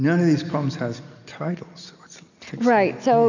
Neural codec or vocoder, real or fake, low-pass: none; real; 7.2 kHz